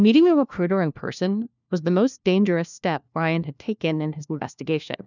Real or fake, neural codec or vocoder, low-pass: fake; codec, 16 kHz, 1 kbps, FunCodec, trained on LibriTTS, 50 frames a second; 7.2 kHz